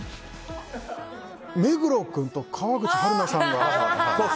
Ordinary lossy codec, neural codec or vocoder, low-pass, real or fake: none; none; none; real